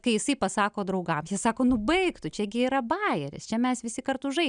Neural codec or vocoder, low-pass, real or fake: none; 9.9 kHz; real